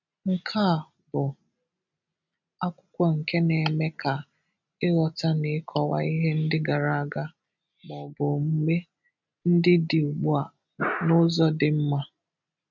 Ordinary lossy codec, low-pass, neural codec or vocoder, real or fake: none; 7.2 kHz; none; real